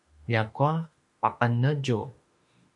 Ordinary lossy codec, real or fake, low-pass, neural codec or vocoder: MP3, 48 kbps; fake; 10.8 kHz; autoencoder, 48 kHz, 32 numbers a frame, DAC-VAE, trained on Japanese speech